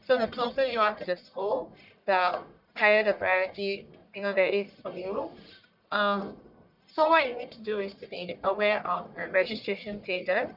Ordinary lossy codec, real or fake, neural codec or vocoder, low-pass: none; fake; codec, 44.1 kHz, 1.7 kbps, Pupu-Codec; 5.4 kHz